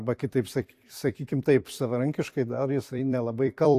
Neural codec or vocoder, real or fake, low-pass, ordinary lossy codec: vocoder, 44.1 kHz, 128 mel bands every 256 samples, BigVGAN v2; fake; 14.4 kHz; AAC, 64 kbps